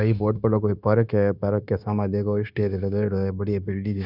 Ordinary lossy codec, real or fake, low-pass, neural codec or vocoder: none; fake; 5.4 kHz; codec, 16 kHz, 0.9 kbps, LongCat-Audio-Codec